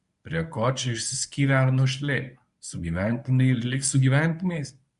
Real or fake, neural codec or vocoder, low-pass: fake; codec, 24 kHz, 0.9 kbps, WavTokenizer, medium speech release version 1; 10.8 kHz